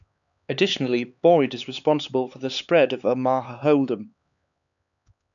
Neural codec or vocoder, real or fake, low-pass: codec, 16 kHz, 4 kbps, X-Codec, HuBERT features, trained on LibriSpeech; fake; 7.2 kHz